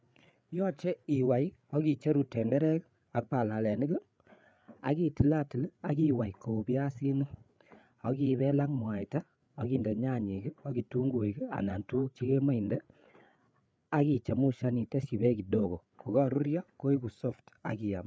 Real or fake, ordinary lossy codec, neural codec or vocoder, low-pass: fake; none; codec, 16 kHz, 8 kbps, FreqCodec, larger model; none